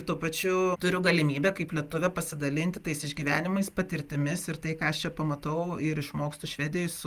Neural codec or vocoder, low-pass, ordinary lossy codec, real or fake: none; 14.4 kHz; Opus, 32 kbps; real